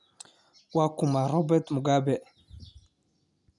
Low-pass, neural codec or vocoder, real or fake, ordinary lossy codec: 10.8 kHz; none; real; none